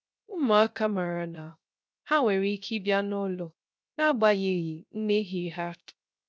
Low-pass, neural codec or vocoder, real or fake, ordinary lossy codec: none; codec, 16 kHz, 0.3 kbps, FocalCodec; fake; none